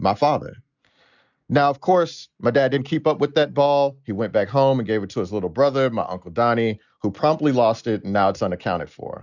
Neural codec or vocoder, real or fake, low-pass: none; real; 7.2 kHz